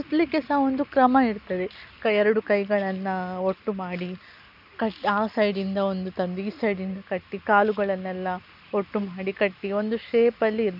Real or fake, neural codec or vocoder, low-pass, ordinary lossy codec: real; none; 5.4 kHz; none